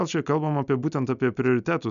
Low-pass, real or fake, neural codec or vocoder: 7.2 kHz; real; none